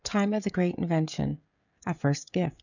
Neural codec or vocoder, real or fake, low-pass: codec, 16 kHz, 16 kbps, FreqCodec, smaller model; fake; 7.2 kHz